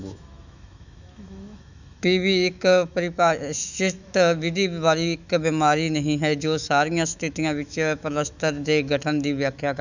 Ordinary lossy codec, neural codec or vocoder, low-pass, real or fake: none; autoencoder, 48 kHz, 128 numbers a frame, DAC-VAE, trained on Japanese speech; 7.2 kHz; fake